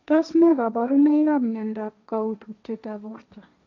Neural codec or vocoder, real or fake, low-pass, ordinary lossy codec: codec, 16 kHz, 1.1 kbps, Voila-Tokenizer; fake; 7.2 kHz; none